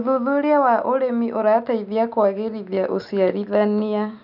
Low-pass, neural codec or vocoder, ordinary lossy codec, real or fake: 5.4 kHz; none; none; real